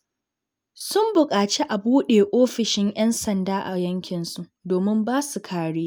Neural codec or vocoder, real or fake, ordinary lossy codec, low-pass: none; real; none; none